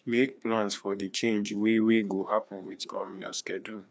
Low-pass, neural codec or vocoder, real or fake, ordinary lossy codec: none; codec, 16 kHz, 2 kbps, FreqCodec, larger model; fake; none